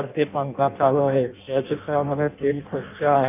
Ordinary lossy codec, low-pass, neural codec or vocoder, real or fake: none; 3.6 kHz; codec, 16 kHz in and 24 kHz out, 0.6 kbps, FireRedTTS-2 codec; fake